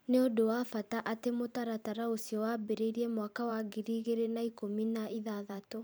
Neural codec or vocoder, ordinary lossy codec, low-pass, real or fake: vocoder, 44.1 kHz, 128 mel bands every 512 samples, BigVGAN v2; none; none; fake